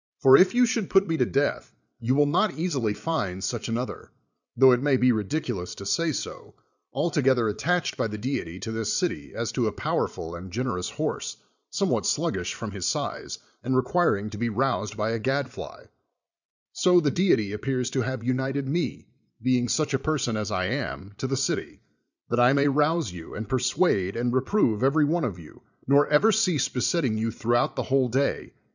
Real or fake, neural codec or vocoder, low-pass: fake; vocoder, 22.05 kHz, 80 mel bands, Vocos; 7.2 kHz